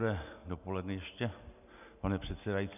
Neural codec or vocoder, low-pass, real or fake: none; 3.6 kHz; real